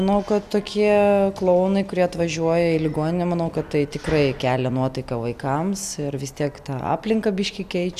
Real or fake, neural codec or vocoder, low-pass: real; none; 14.4 kHz